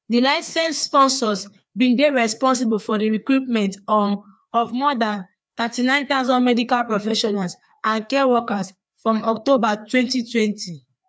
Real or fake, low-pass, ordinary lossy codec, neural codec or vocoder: fake; none; none; codec, 16 kHz, 2 kbps, FreqCodec, larger model